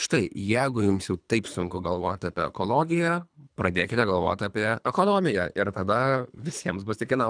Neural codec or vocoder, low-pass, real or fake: codec, 24 kHz, 3 kbps, HILCodec; 9.9 kHz; fake